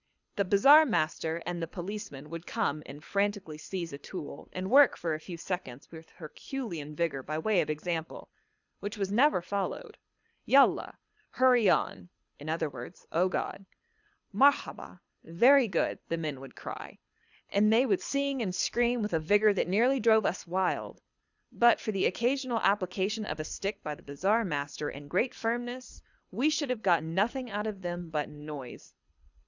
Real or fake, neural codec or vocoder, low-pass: fake; codec, 24 kHz, 6 kbps, HILCodec; 7.2 kHz